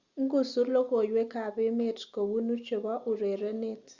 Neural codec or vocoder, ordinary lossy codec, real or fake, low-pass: none; Opus, 64 kbps; real; 7.2 kHz